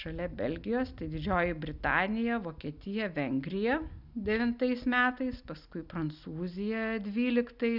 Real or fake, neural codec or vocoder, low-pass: real; none; 5.4 kHz